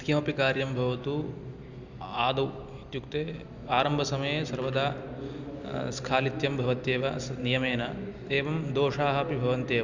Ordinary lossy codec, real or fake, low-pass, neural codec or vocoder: Opus, 64 kbps; real; 7.2 kHz; none